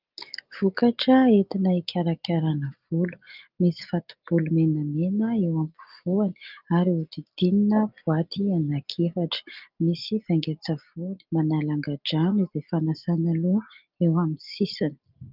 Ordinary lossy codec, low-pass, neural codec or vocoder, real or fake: Opus, 24 kbps; 5.4 kHz; none; real